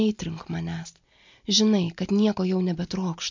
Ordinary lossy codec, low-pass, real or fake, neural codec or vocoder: MP3, 64 kbps; 7.2 kHz; real; none